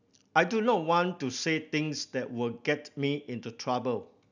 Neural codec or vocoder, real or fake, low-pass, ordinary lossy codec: none; real; 7.2 kHz; none